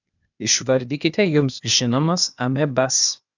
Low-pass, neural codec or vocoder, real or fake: 7.2 kHz; codec, 16 kHz, 0.8 kbps, ZipCodec; fake